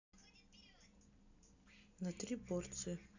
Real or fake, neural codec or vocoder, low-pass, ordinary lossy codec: real; none; 7.2 kHz; none